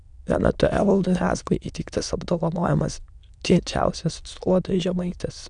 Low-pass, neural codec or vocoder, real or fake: 9.9 kHz; autoencoder, 22.05 kHz, a latent of 192 numbers a frame, VITS, trained on many speakers; fake